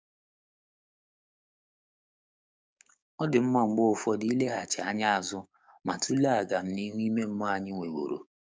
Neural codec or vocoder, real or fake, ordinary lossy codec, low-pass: codec, 16 kHz, 6 kbps, DAC; fake; none; none